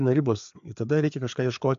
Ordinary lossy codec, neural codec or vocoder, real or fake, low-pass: AAC, 64 kbps; codec, 16 kHz, 4 kbps, FreqCodec, larger model; fake; 7.2 kHz